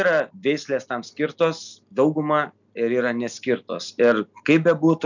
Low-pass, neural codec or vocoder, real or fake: 7.2 kHz; none; real